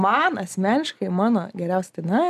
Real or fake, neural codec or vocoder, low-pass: real; none; 14.4 kHz